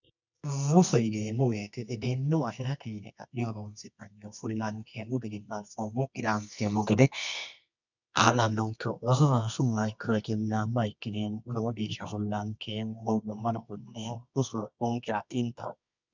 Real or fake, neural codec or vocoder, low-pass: fake; codec, 24 kHz, 0.9 kbps, WavTokenizer, medium music audio release; 7.2 kHz